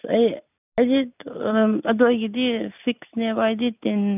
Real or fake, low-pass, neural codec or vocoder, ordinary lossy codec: real; 3.6 kHz; none; none